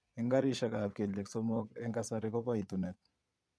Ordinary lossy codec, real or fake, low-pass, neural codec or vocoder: none; fake; none; vocoder, 22.05 kHz, 80 mel bands, Vocos